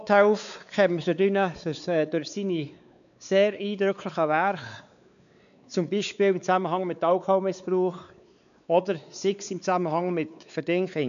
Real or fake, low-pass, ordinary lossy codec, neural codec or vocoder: fake; 7.2 kHz; none; codec, 16 kHz, 4 kbps, X-Codec, WavLM features, trained on Multilingual LibriSpeech